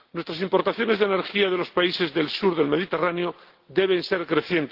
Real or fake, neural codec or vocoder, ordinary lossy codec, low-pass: real; none; Opus, 16 kbps; 5.4 kHz